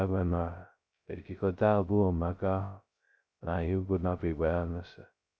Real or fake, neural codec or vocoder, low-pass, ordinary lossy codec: fake; codec, 16 kHz, 0.2 kbps, FocalCodec; none; none